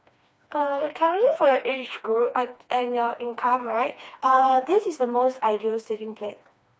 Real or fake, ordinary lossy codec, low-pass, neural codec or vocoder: fake; none; none; codec, 16 kHz, 2 kbps, FreqCodec, smaller model